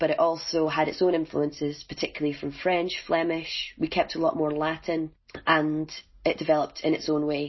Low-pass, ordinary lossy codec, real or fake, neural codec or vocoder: 7.2 kHz; MP3, 24 kbps; real; none